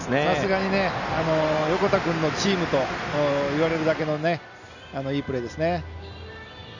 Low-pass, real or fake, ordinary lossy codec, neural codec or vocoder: 7.2 kHz; real; none; none